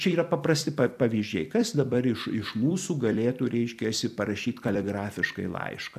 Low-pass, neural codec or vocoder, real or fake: 14.4 kHz; vocoder, 44.1 kHz, 128 mel bands every 256 samples, BigVGAN v2; fake